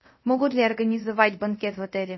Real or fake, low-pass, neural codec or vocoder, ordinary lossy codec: fake; 7.2 kHz; codec, 24 kHz, 0.5 kbps, DualCodec; MP3, 24 kbps